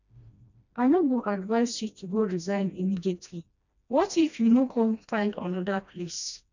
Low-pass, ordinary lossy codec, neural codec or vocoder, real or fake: 7.2 kHz; none; codec, 16 kHz, 1 kbps, FreqCodec, smaller model; fake